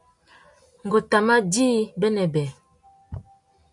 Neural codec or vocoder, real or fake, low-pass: none; real; 10.8 kHz